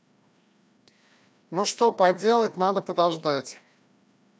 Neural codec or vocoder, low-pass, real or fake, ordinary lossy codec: codec, 16 kHz, 1 kbps, FreqCodec, larger model; none; fake; none